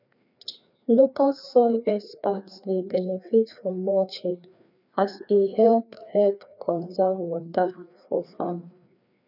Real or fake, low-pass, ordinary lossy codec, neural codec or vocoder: fake; 5.4 kHz; none; codec, 16 kHz, 2 kbps, FreqCodec, larger model